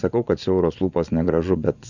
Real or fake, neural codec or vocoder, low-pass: fake; vocoder, 24 kHz, 100 mel bands, Vocos; 7.2 kHz